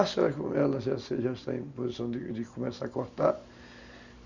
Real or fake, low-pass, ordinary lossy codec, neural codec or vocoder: real; 7.2 kHz; Opus, 64 kbps; none